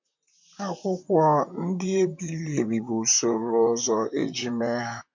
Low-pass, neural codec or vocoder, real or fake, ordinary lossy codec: 7.2 kHz; vocoder, 44.1 kHz, 128 mel bands, Pupu-Vocoder; fake; MP3, 48 kbps